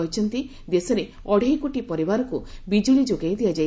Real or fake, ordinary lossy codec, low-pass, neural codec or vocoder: real; none; none; none